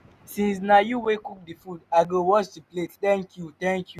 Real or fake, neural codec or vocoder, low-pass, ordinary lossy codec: real; none; 14.4 kHz; none